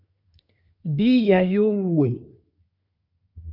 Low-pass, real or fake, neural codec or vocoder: 5.4 kHz; fake; codec, 24 kHz, 1 kbps, SNAC